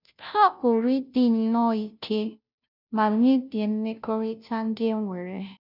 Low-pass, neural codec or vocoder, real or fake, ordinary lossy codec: 5.4 kHz; codec, 16 kHz, 0.5 kbps, FunCodec, trained on Chinese and English, 25 frames a second; fake; none